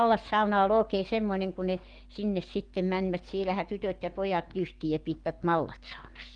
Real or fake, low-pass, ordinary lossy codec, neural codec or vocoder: fake; 9.9 kHz; Opus, 24 kbps; codec, 44.1 kHz, 7.8 kbps, DAC